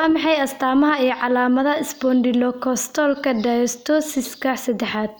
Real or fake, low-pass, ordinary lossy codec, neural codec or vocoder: real; none; none; none